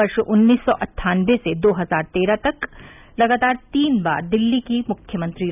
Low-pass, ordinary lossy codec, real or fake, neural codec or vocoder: 3.6 kHz; none; real; none